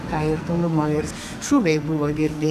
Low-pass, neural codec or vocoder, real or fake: 14.4 kHz; codec, 44.1 kHz, 2.6 kbps, SNAC; fake